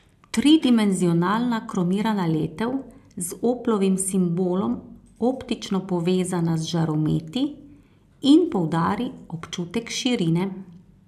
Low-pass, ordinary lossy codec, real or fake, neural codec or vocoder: 14.4 kHz; AAC, 96 kbps; real; none